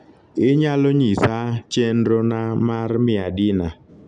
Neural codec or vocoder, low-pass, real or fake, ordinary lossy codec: none; 9.9 kHz; real; none